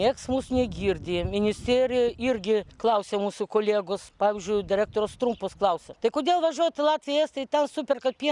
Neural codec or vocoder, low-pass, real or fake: none; 10.8 kHz; real